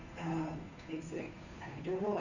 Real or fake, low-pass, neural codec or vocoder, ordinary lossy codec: fake; 7.2 kHz; codec, 16 kHz, 2 kbps, FunCodec, trained on Chinese and English, 25 frames a second; none